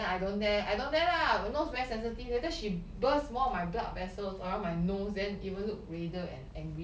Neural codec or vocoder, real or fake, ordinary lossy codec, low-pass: none; real; none; none